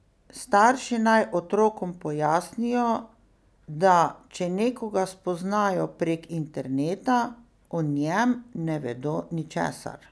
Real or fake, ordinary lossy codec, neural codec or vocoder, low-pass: real; none; none; none